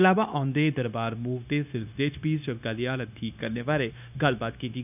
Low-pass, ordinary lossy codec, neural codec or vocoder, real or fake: 3.6 kHz; none; codec, 16 kHz, 0.9 kbps, LongCat-Audio-Codec; fake